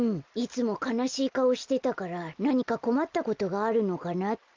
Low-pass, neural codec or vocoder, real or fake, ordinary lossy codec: 7.2 kHz; none; real; Opus, 32 kbps